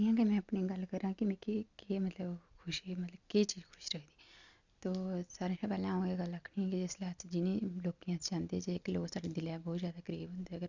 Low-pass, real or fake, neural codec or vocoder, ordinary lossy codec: 7.2 kHz; real; none; none